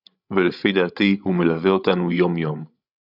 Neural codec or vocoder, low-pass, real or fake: codec, 16 kHz, 16 kbps, FreqCodec, larger model; 5.4 kHz; fake